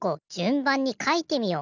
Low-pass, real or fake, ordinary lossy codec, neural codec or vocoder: 7.2 kHz; real; none; none